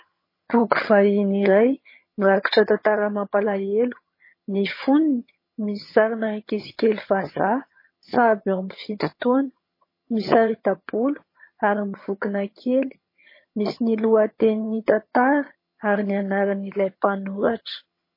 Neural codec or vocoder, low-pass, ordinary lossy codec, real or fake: vocoder, 22.05 kHz, 80 mel bands, HiFi-GAN; 5.4 kHz; MP3, 24 kbps; fake